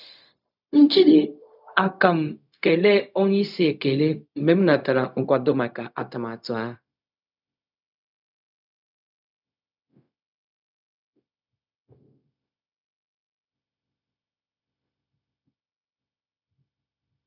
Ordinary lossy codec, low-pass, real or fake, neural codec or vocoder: none; 5.4 kHz; fake; codec, 16 kHz, 0.4 kbps, LongCat-Audio-Codec